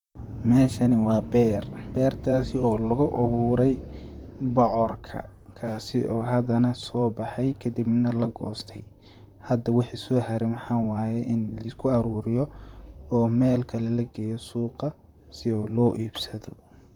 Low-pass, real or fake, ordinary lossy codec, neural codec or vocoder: 19.8 kHz; fake; none; vocoder, 44.1 kHz, 128 mel bands, Pupu-Vocoder